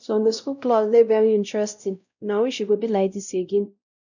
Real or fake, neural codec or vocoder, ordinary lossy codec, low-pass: fake; codec, 16 kHz, 0.5 kbps, X-Codec, WavLM features, trained on Multilingual LibriSpeech; none; 7.2 kHz